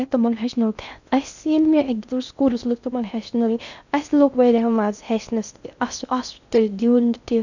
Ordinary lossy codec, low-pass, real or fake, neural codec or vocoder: none; 7.2 kHz; fake; codec, 16 kHz in and 24 kHz out, 0.6 kbps, FocalCodec, streaming, 2048 codes